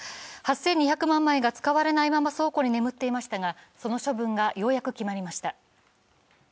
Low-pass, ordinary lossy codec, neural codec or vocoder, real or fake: none; none; none; real